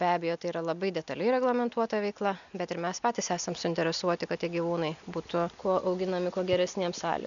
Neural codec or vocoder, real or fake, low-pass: none; real; 7.2 kHz